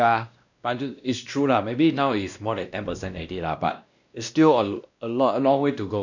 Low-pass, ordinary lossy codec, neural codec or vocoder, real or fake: 7.2 kHz; none; codec, 16 kHz, 1 kbps, X-Codec, WavLM features, trained on Multilingual LibriSpeech; fake